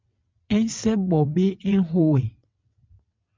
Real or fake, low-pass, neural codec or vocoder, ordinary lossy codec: fake; 7.2 kHz; vocoder, 22.05 kHz, 80 mel bands, WaveNeXt; MP3, 64 kbps